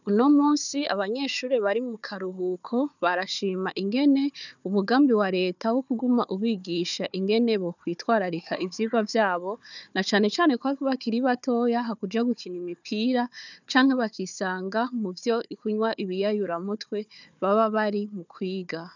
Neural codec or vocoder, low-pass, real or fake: codec, 16 kHz, 4 kbps, FunCodec, trained on Chinese and English, 50 frames a second; 7.2 kHz; fake